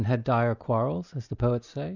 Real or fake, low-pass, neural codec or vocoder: real; 7.2 kHz; none